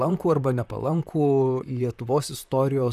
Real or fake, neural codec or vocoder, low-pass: fake; vocoder, 44.1 kHz, 128 mel bands, Pupu-Vocoder; 14.4 kHz